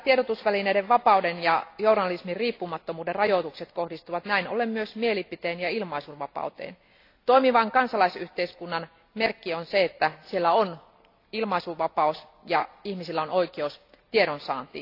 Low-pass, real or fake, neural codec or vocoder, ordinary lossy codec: 5.4 kHz; real; none; AAC, 32 kbps